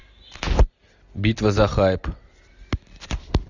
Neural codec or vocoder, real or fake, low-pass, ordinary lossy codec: none; real; 7.2 kHz; Opus, 64 kbps